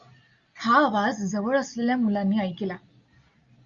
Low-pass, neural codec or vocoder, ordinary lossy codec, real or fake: 7.2 kHz; none; Opus, 64 kbps; real